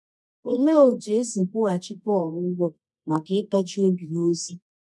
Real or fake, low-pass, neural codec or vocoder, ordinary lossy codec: fake; none; codec, 24 kHz, 0.9 kbps, WavTokenizer, medium music audio release; none